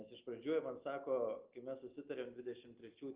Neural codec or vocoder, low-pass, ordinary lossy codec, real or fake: none; 3.6 kHz; Opus, 32 kbps; real